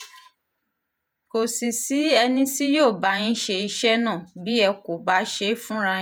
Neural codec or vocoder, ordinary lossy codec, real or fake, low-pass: vocoder, 48 kHz, 128 mel bands, Vocos; none; fake; none